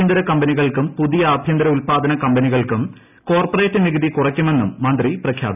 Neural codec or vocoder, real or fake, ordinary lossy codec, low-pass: none; real; none; 3.6 kHz